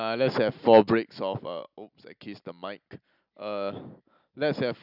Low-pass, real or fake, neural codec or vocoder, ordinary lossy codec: 5.4 kHz; real; none; none